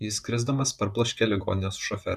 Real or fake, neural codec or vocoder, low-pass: fake; vocoder, 44.1 kHz, 128 mel bands, Pupu-Vocoder; 14.4 kHz